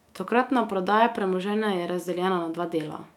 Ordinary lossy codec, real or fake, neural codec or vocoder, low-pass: none; fake; autoencoder, 48 kHz, 128 numbers a frame, DAC-VAE, trained on Japanese speech; 19.8 kHz